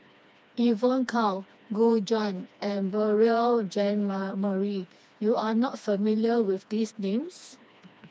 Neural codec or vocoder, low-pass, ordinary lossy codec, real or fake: codec, 16 kHz, 2 kbps, FreqCodec, smaller model; none; none; fake